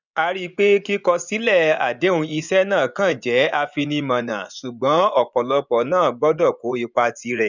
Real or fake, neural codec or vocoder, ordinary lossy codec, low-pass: fake; vocoder, 24 kHz, 100 mel bands, Vocos; none; 7.2 kHz